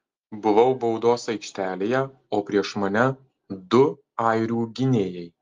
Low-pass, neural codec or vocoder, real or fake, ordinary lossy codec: 7.2 kHz; none; real; Opus, 32 kbps